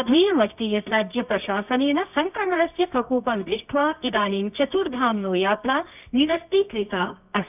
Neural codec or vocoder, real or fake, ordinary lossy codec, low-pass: codec, 24 kHz, 0.9 kbps, WavTokenizer, medium music audio release; fake; none; 3.6 kHz